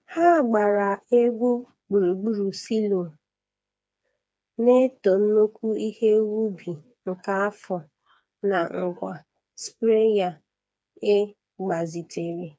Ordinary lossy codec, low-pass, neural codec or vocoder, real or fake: none; none; codec, 16 kHz, 4 kbps, FreqCodec, smaller model; fake